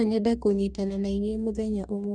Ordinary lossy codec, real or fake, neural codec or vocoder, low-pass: none; fake; codec, 44.1 kHz, 2.6 kbps, DAC; 9.9 kHz